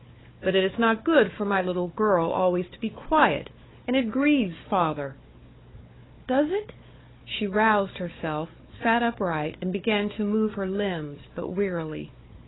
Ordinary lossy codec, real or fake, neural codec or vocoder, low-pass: AAC, 16 kbps; fake; codec, 16 kHz, 4 kbps, FunCodec, trained on Chinese and English, 50 frames a second; 7.2 kHz